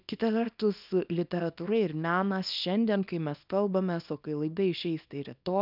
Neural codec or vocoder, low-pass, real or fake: codec, 24 kHz, 0.9 kbps, WavTokenizer, small release; 5.4 kHz; fake